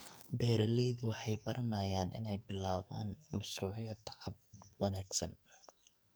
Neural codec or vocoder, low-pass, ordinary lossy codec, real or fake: codec, 44.1 kHz, 2.6 kbps, SNAC; none; none; fake